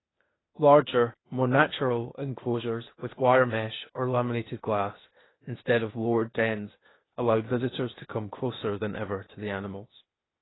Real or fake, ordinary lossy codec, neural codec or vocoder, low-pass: fake; AAC, 16 kbps; codec, 16 kHz, 0.8 kbps, ZipCodec; 7.2 kHz